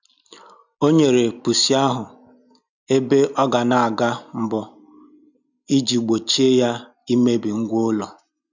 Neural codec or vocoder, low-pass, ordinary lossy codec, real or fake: none; 7.2 kHz; none; real